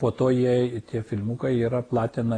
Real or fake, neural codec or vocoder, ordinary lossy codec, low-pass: real; none; AAC, 32 kbps; 9.9 kHz